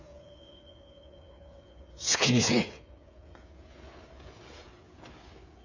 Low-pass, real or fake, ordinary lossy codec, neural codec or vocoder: 7.2 kHz; fake; none; codec, 16 kHz, 8 kbps, FreqCodec, smaller model